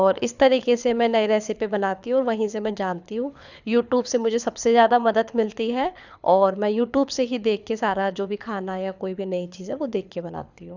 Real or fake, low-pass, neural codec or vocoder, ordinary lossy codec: fake; 7.2 kHz; codec, 24 kHz, 6 kbps, HILCodec; none